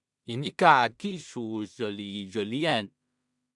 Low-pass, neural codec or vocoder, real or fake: 10.8 kHz; codec, 16 kHz in and 24 kHz out, 0.4 kbps, LongCat-Audio-Codec, two codebook decoder; fake